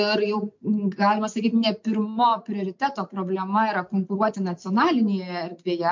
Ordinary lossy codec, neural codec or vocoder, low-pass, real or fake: MP3, 48 kbps; none; 7.2 kHz; real